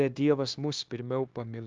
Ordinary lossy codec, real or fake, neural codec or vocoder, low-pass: Opus, 32 kbps; fake; codec, 16 kHz, 0.9 kbps, LongCat-Audio-Codec; 7.2 kHz